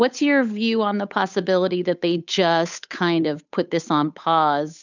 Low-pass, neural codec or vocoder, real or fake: 7.2 kHz; codec, 16 kHz, 8 kbps, FunCodec, trained on Chinese and English, 25 frames a second; fake